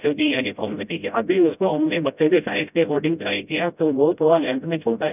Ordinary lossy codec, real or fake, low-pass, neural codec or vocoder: none; fake; 3.6 kHz; codec, 16 kHz, 0.5 kbps, FreqCodec, smaller model